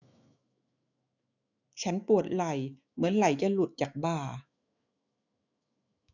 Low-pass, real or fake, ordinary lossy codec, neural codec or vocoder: 7.2 kHz; fake; none; autoencoder, 48 kHz, 128 numbers a frame, DAC-VAE, trained on Japanese speech